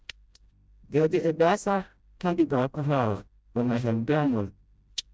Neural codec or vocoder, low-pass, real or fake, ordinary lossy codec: codec, 16 kHz, 0.5 kbps, FreqCodec, smaller model; none; fake; none